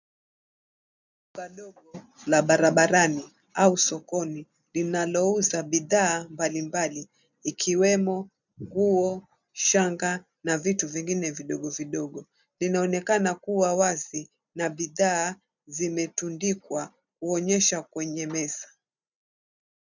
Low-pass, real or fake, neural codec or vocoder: 7.2 kHz; real; none